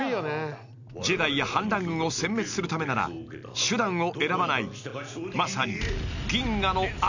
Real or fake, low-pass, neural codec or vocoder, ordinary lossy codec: real; 7.2 kHz; none; none